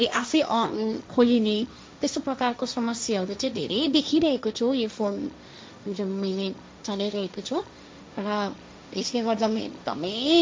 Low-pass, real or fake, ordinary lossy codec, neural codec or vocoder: none; fake; none; codec, 16 kHz, 1.1 kbps, Voila-Tokenizer